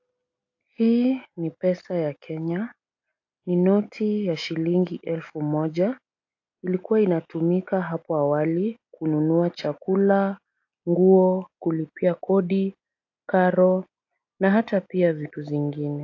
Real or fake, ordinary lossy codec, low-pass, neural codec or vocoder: real; AAC, 48 kbps; 7.2 kHz; none